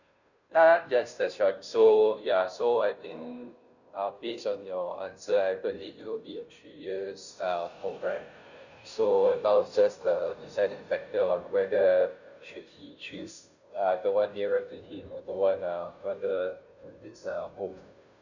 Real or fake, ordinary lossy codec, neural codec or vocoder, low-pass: fake; none; codec, 16 kHz, 0.5 kbps, FunCodec, trained on Chinese and English, 25 frames a second; 7.2 kHz